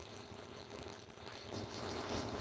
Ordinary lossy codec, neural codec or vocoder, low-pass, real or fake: none; codec, 16 kHz, 4.8 kbps, FACodec; none; fake